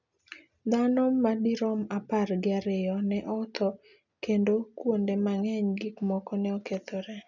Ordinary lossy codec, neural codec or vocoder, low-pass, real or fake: none; none; 7.2 kHz; real